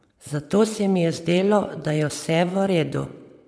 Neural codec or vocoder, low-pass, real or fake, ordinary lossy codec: vocoder, 22.05 kHz, 80 mel bands, WaveNeXt; none; fake; none